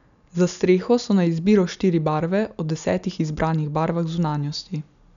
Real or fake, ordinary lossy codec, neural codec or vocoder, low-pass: real; none; none; 7.2 kHz